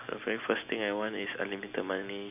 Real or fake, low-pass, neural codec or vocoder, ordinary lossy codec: real; 3.6 kHz; none; none